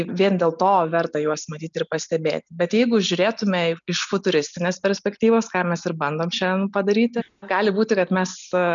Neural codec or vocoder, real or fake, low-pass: none; real; 7.2 kHz